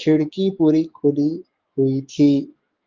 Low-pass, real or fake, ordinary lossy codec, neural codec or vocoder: 7.2 kHz; fake; Opus, 32 kbps; autoencoder, 48 kHz, 128 numbers a frame, DAC-VAE, trained on Japanese speech